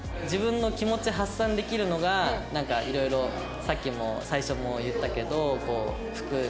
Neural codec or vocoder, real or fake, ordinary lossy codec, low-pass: none; real; none; none